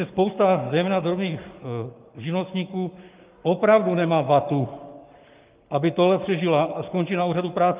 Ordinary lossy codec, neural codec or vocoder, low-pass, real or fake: Opus, 24 kbps; codec, 44.1 kHz, 7.8 kbps, Pupu-Codec; 3.6 kHz; fake